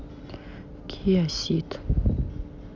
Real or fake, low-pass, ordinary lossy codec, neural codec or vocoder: real; 7.2 kHz; none; none